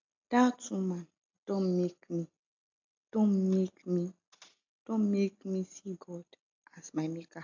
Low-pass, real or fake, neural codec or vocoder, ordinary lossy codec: 7.2 kHz; real; none; AAC, 48 kbps